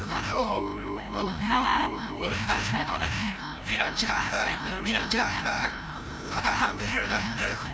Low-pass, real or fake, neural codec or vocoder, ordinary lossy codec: none; fake; codec, 16 kHz, 0.5 kbps, FreqCodec, larger model; none